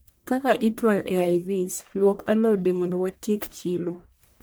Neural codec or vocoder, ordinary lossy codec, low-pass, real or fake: codec, 44.1 kHz, 1.7 kbps, Pupu-Codec; none; none; fake